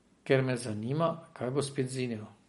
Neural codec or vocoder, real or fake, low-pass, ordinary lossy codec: none; real; 19.8 kHz; MP3, 48 kbps